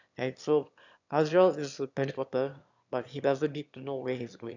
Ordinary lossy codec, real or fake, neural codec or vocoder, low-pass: none; fake; autoencoder, 22.05 kHz, a latent of 192 numbers a frame, VITS, trained on one speaker; 7.2 kHz